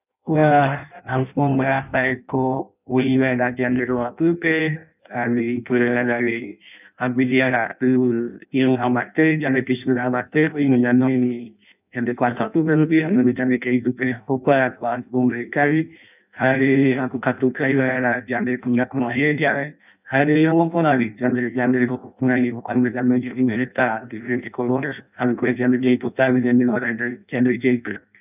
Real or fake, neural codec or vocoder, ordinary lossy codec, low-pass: fake; codec, 16 kHz in and 24 kHz out, 0.6 kbps, FireRedTTS-2 codec; none; 3.6 kHz